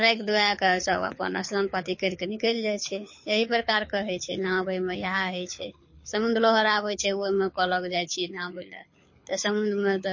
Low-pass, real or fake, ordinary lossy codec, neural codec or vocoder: 7.2 kHz; fake; MP3, 32 kbps; codec, 24 kHz, 6 kbps, HILCodec